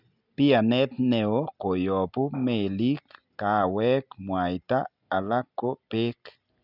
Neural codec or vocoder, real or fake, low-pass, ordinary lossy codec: none; real; 5.4 kHz; none